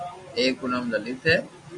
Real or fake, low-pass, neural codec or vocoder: real; 10.8 kHz; none